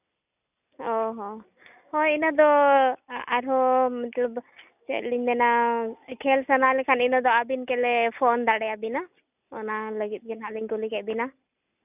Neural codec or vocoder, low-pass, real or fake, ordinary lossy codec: none; 3.6 kHz; real; none